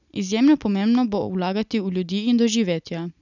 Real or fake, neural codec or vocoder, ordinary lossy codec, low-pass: real; none; none; 7.2 kHz